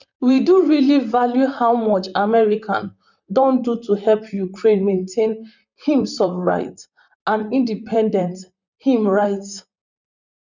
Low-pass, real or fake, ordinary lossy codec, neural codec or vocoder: 7.2 kHz; fake; none; vocoder, 44.1 kHz, 128 mel bands, Pupu-Vocoder